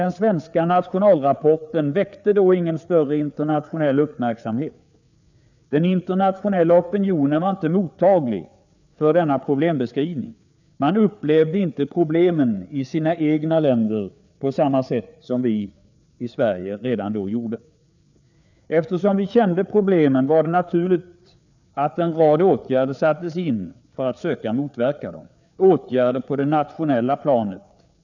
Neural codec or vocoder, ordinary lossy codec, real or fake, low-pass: codec, 16 kHz, 4 kbps, FreqCodec, larger model; none; fake; 7.2 kHz